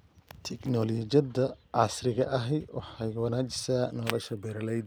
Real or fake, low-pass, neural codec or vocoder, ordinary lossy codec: real; none; none; none